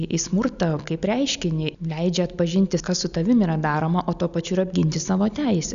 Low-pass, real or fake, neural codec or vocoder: 7.2 kHz; real; none